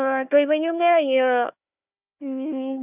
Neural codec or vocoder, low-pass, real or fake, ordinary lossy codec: codec, 16 kHz, 1 kbps, FunCodec, trained on Chinese and English, 50 frames a second; 3.6 kHz; fake; none